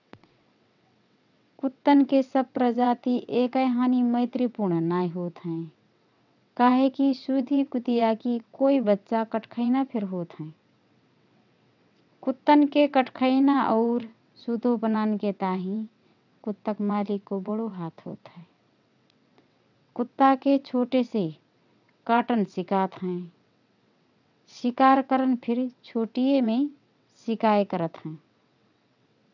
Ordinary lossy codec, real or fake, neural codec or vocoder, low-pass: none; fake; vocoder, 22.05 kHz, 80 mel bands, WaveNeXt; 7.2 kHz